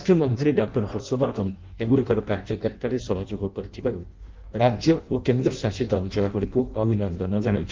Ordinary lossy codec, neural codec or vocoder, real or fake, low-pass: Opus, 32 kbps; codec, 16 kHz in and 24 kHz out, 0.6 kbps, FireRedTTS-2 codec; fake; 7.2 kHz